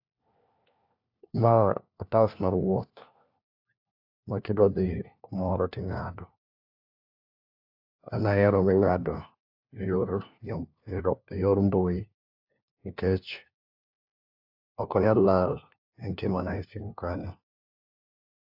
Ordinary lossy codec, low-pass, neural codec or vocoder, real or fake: AAC, 48 kbps; 5.4 kHz; codec, 16 kHz, 1 kbps, FunCodec, trained on LibriTTS, 50 frames a second; fake